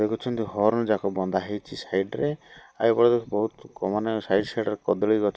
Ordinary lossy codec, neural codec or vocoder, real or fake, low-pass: none; none; real; none